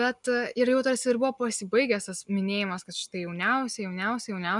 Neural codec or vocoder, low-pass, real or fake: none; 10.8 kHz; real